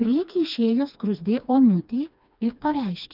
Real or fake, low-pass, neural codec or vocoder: fake; 5.4 kHz; codec, 16 kHz, 2 kbps, FreqCodec, smaller model